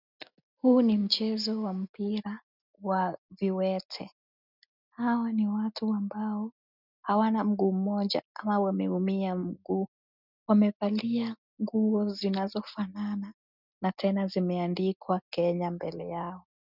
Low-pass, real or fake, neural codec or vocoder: 5.4 kHz; real; none